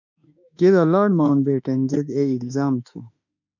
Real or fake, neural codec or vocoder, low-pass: fake; autoencoder, 48 kHz, 32 numbers a frame, DAC-VAE, trained on Japanese speech; 7.2 kHz